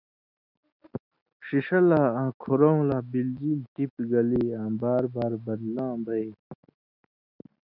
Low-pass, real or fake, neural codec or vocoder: 5.4 kHz; real; none